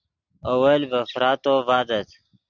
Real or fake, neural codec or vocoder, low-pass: real; none; 7.2 kHz